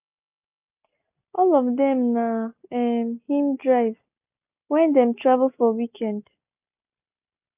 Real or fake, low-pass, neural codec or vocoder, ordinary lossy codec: real; 3.6 kHz; none; none